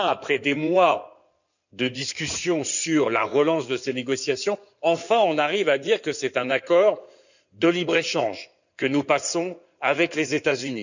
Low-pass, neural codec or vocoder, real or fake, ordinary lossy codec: 7.2 kHz; codec, 16 kHz in and 24 kHz out, 2.2 kbps, FireRedTTS-2 codec; fake; none